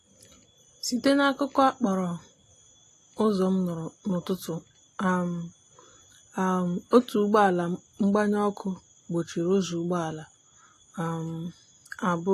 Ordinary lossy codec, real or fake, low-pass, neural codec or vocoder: AAC, 48 kbps; real; 14.4 kHz; none